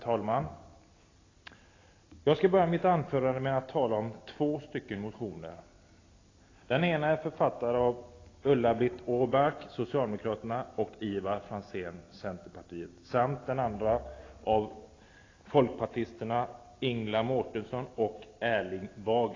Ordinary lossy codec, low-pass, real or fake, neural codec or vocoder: AAC, 32 kbps; 7.2 kHz; real; none